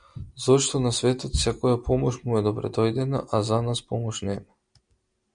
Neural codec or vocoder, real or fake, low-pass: none; real; 9.9 kHz